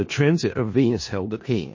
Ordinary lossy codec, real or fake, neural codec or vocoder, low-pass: MP3, 32 kbps; fake; codec, 16 kHz in and 24 kHz out, 0.4 kbps, LongCat-Audio-Codec, four codebook decoder; 7.2 kHz